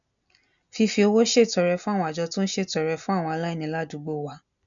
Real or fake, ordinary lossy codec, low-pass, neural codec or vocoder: real; none; 7.2 kHz; none